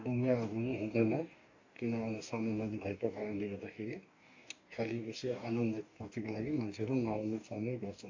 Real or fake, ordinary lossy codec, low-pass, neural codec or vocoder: fake; AAC, 48 kbps; 7.2 kHz; codec, 44.1 kHz, 2.6 kbps, DAC